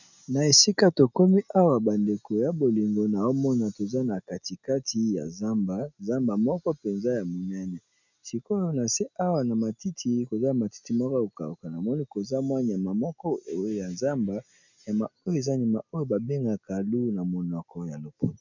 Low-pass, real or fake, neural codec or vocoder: 7.2 kHz; real; none